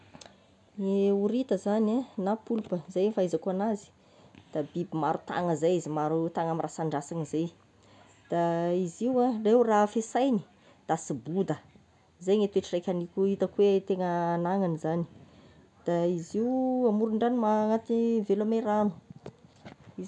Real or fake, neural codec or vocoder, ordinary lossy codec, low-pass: real; none; none; 10.8 kHz